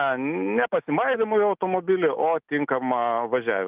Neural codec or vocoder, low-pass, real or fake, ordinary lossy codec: none; 3.6 kHz; real; Opus, 32 kbps